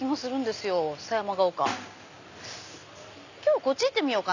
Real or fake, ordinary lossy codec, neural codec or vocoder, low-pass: real; none; none; 7.2 kHz